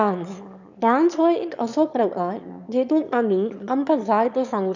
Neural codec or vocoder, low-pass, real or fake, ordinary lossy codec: autoencoder, 22.05 kHz, a latent of 192 numbers a frame, VITS, trained on one speaker; 7.2 kHz; fake; none